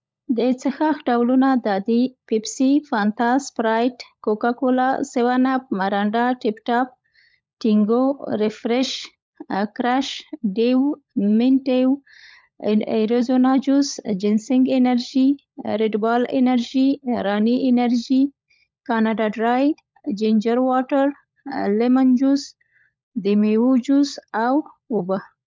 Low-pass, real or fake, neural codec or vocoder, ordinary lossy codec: none; fake; codec, 16 kHz, 16 kbps, FunCodec, trained on LibriTTS, 50 frames a second; none